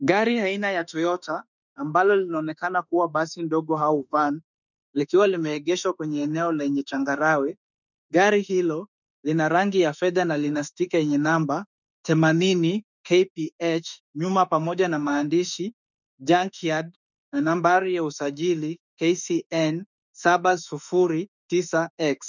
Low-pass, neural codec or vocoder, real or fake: 7.2 kHz; autoencoder, 48 kHz, 32 numbers a frame, DAC-VAE, trained on Japanese speech; fake